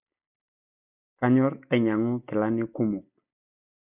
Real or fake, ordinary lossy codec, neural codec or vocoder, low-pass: real; none; none; 3.6 kHz